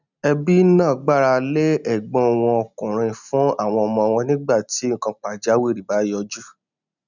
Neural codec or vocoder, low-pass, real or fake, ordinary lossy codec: none; 7.2 kHz; real; none